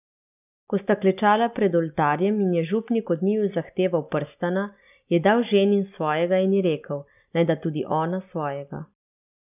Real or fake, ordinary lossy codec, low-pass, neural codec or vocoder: real; none; 3.6 kHz; none